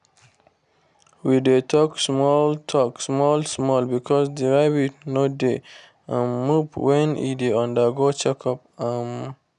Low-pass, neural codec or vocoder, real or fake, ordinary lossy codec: 10.8 kHz; none; real; none